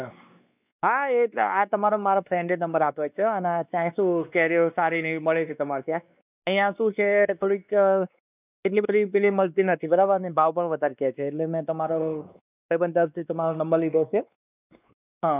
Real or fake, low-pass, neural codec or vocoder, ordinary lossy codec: fake; 3.6 kHz; codec, 16 kHz, 2 kbps, X-Codec, WavLM features, trained on Multilingual LibriSpeech; none